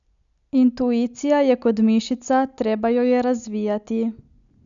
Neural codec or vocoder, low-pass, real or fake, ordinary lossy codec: none; 7.2 kHz; real; none